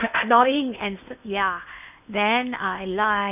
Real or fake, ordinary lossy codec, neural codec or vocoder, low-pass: fake; none; codec, 16 kHz in and 24 kHz out, 0.8 kbps, FocalCodec, streaming, 65536 codes; 3.6 kHz